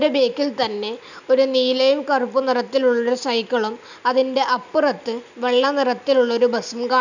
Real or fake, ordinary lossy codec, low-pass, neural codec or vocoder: real; none; 7.2 kHz; none